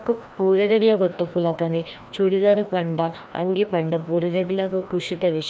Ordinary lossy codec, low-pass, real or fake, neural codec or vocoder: none; none; fake; codec, 16 kHz, 1 kbps, FreqCodec, larger model